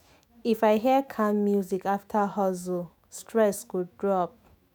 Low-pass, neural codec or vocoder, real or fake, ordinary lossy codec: none; autoencoder, 48 kHz, 128 numbers a frame, DAC-VAE, trained on Japanese speech; fake; none